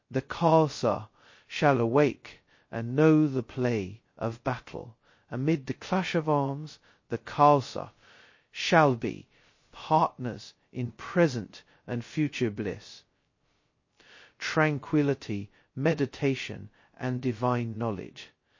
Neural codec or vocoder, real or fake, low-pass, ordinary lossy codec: codec, 16 kHz, 0.2 kbps, FocalCodec; fake; 7.2 kHz; MP3, 32 kbps